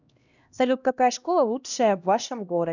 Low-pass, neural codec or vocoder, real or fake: 7.2 kHz; codec, 16 kHz, 1 kbps, X-Codec, HuBERT features, trained on LibriSpeech; fake